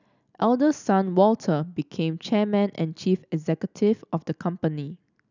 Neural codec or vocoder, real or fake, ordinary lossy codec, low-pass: none; real; none; 7.2 kHz